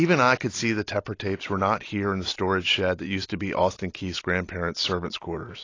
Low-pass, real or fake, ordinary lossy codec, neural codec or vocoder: 7.2 kHz; real; AAC, 32 kbps; none